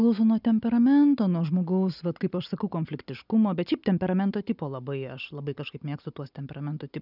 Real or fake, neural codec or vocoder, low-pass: real; none; 5.4 kHz